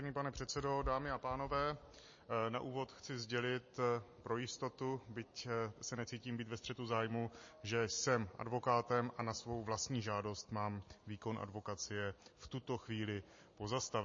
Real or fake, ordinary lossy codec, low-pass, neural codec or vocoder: real; MP3, 32 kbps; 7.2 kHz; none